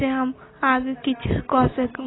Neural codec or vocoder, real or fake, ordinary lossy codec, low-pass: none; real; AAC, 16 kbps; 7.2 kHz